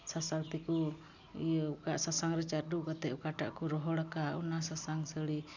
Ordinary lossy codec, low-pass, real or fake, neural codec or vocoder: none; 7.2 kHz; real; none